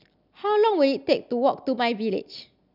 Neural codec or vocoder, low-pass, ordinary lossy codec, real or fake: none; 5.4 kHz; none; real